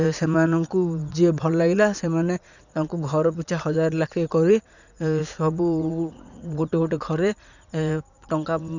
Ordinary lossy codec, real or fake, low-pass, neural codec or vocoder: none; fake; 7.2 kHz; vocoder, 44.1 kHz, 80 mel bands, Vocos